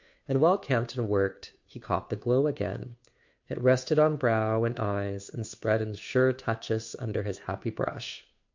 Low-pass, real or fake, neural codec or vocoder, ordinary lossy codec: 7.2 kHz; fake; codec, 16 kHz, 2 kbps, FunCodec, trained on Chinese and English, 25 frames a second; MP3, 48 kbps